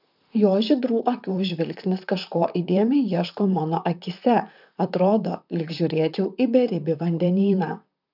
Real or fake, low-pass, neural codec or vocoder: fake; 5.4 kHz; vocoder, 44.1 kHz, 128 mel bands, Pupu-Vocoder